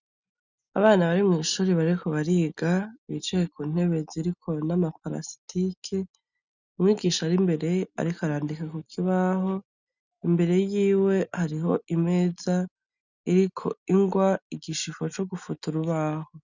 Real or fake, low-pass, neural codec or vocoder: real; 7.2 kHz; none